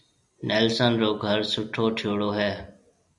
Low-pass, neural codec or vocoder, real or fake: 10.8 kHz; none; real